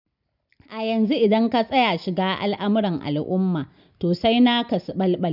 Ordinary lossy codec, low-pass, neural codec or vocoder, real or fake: none; 5.4 kHz; none; real